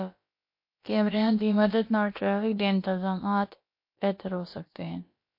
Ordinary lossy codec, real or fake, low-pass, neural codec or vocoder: MP3, 32 kbps; fake; 5.4 kHz; codec, 16 kHz, about 1 kbps, DyCAST, with the encoder's durations